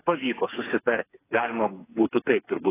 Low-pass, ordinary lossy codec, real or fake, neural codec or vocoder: 3.6 kHz; AAC, 16 kbps; fake; codec, 16 kHz, 4 kbps, FreqCodec, smaller model